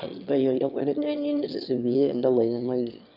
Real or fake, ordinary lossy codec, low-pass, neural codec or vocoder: fake; none; 5.4 kHz; autoencoder, 22.05 kHz, a latent of 192 numbers a frame, VITS, trained on one speaker